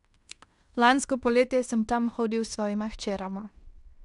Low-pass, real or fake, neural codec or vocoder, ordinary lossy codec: 10.8 kHz; fake; codec, 16 kHz in and 24 kHz out, 0.9 kbps, LongCat-Audio-Codec, fine tuned four codebook decoder; none